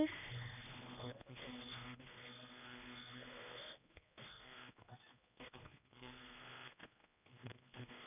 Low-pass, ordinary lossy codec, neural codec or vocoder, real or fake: 3.6 kHz; none; codec, 16 kHz, 2 kbps, X-Codec, HuBERT features, trained on general audio; fake